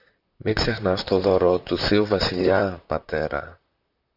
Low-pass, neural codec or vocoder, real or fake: 5.4 kHz; vocoder, 44.1 kHz, 128 mel bands, Pupu-Vocoder; fake